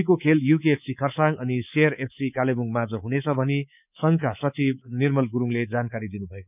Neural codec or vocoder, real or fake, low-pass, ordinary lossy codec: codec, 24 kHz, 3.1 kbps, DualCodec; fake; 3.6 kHz; none